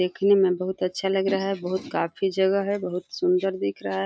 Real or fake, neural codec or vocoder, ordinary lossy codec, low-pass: real; none; none; none